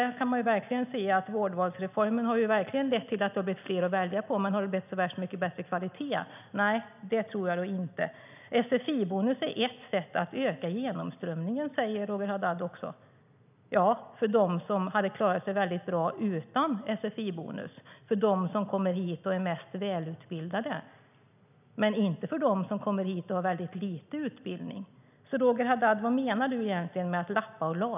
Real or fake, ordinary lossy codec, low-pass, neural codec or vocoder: real; none; 3.6 kHz; none